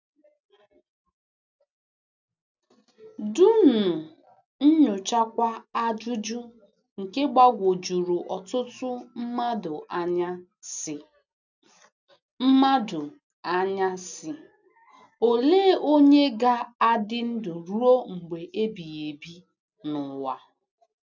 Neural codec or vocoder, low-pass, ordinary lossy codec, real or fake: none; 7.2 kHz; none; real